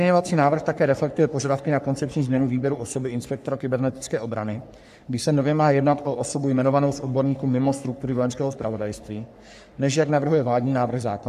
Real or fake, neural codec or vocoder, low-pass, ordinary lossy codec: fake; codec, 44.1 kHz, 3.4 kbps, Pupu-Codec; 14.4 kHz; AAC, 96 kbps